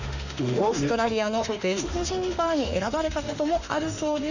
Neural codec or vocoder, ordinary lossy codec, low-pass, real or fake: autoencoder, 48 kHz, 32 numbers a frame, DAC-VAE, trained on Japanese speech; none; 7.2 kHz; fake